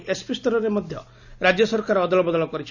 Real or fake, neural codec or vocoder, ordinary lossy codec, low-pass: real; none; none; 7.2 kHz